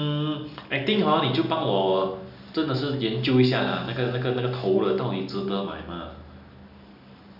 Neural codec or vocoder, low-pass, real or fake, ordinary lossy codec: none; 5.4 kHz; real; none